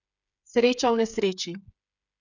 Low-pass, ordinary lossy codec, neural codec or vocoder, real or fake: 7.2 kHz; none; codec, 16 kHz, 8 kbps, FreqCodec, smaller model; fake